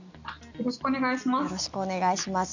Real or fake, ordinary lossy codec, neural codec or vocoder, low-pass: real; none; none; 7.2 kHz